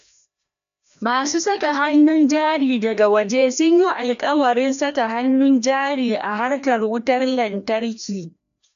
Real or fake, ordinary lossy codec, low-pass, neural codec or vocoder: fake; none; 7.2 kHz; codec, 16 kHz, 1 kbps, FreqCodec, larger model